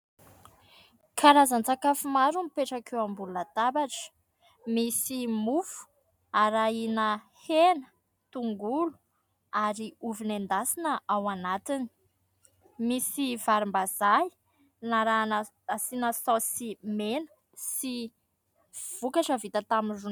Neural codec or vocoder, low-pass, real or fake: none; 19.8 kHz; real